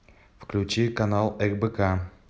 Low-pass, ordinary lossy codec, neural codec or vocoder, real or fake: none; none; none; real